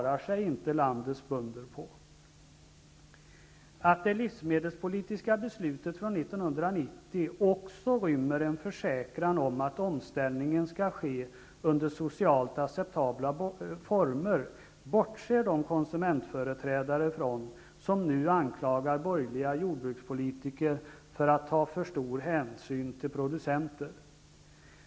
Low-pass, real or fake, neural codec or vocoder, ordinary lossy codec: none; real; none; none